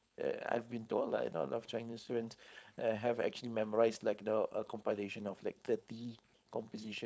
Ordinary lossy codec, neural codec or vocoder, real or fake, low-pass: none; codec, 16 kHz, 4.8 kbps, FACodec; fake; none